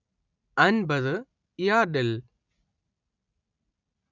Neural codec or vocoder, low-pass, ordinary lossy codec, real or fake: none; 7.2 kHz; none; real